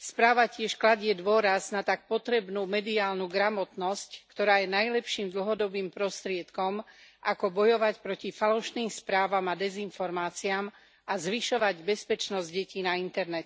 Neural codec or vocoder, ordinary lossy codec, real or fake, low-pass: none; none; real; none